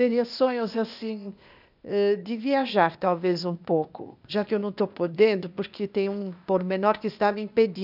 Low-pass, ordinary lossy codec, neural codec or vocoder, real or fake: 5.4 kHz; none; codec, 16 kHz, 0.8 kbps, ZipCodec; fake